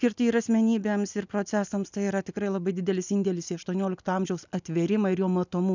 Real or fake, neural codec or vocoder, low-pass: real; none; 7.2 kHz